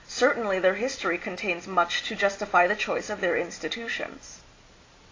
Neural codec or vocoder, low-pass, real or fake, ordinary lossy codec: none; 7.2 kHz; real; AAC, 32 kbps